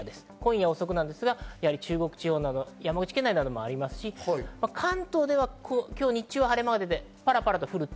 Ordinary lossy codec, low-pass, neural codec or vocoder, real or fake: none; none; none; real